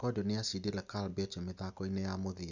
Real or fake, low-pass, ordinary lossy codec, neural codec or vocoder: real; 7.2 kHz; none; none